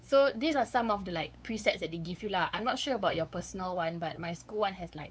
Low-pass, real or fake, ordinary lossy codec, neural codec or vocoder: none; fake; none; codec, 16 kHz, 4 kbps, X-Codec, WavLM features, trained on Multilingual LibriSpeech